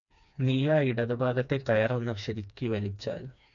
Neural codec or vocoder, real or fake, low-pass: codec, 16 kHz, 2 kbps, FreqCodec, smaller model; fake; 7.2 kHz